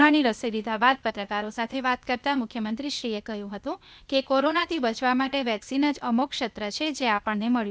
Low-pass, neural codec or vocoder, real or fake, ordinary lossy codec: none; codec, 16 kHz, 0.8 kbps, ZipCodec; fake; none